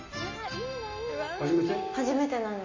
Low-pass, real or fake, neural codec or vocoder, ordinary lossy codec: 7.2 kHz; real; none; MP3, 32 kbps